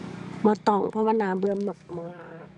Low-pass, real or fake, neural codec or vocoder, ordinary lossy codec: 10.8 kHz; fake; codec, 44.1 kHz, 7.8 kbps, DAC; none